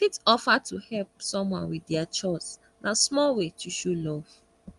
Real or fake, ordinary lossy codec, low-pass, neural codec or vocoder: real; Opus, 32 kbps; 10.8 kHz; none